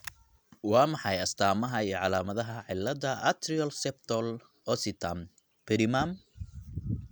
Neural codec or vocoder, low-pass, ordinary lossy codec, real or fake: none; none; none; real